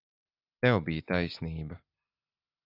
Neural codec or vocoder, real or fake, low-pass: none; real; 5.4 kHz